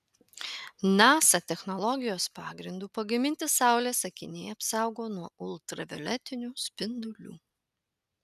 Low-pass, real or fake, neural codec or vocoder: 14.4 kHz; real; none